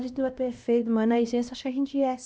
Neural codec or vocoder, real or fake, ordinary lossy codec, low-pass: codec, 16 kHz, 1 kbps, X-Codec, HuBERT features, trained on LibriSpeech; fake; none; none